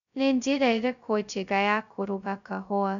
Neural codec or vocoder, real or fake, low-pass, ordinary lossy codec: codec, 16 kHz, 0.2 kbps, FocalCodec; fake; 7.2 kHz; none